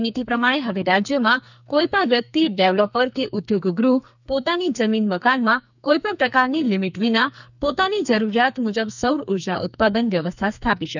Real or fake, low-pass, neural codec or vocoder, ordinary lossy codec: fake; 7.2 kHz; codec, 44.1 kHz, 2.6 kbps, SNAC; none